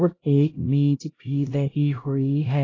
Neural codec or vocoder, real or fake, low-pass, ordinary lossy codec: codec, 16 kHz, 0.5 kbps, X-Codec, HuBERT features, trained on LibriSpeech; fake; 7.2 kHz; AAC, 32 kbps